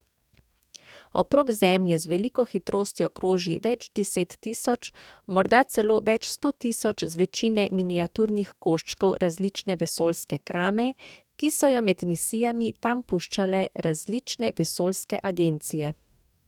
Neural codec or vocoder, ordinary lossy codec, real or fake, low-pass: codec, 44.1 kHz, 2.6 kbps, DAC; none; fake; 19.8 kHz